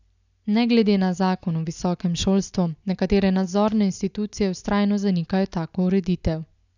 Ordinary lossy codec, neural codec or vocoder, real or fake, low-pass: none; none; real; 7.2 kHz